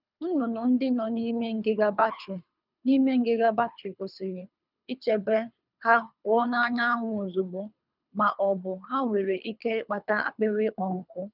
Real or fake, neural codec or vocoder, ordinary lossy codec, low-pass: fake; codec, 24 kHz, 3 kbps, HILCodec; none; 5.4 kHz